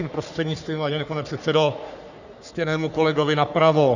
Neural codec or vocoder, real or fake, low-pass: codec, 44.1 kHz, 3.4 kbps, Pupu-Codec; fake; 7.2 kHz